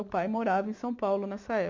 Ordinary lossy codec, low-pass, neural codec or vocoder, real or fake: MP3, 48 kbps; 7.2 kHz; none; real